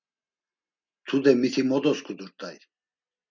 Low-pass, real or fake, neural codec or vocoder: 7.2 kHz; real; none